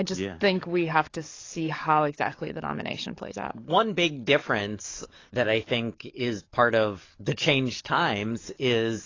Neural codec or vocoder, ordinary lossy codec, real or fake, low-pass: codec, 44.1 kHz, 7.8 kbps, DAC; AAC, 32 kbps; fake; 7.2 kHz